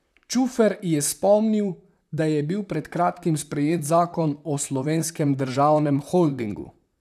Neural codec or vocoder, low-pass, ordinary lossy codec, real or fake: vocoder, 44.1 kHz, 128 mel bands, Pupu-Vocoder; 14.4 kHz; none; fake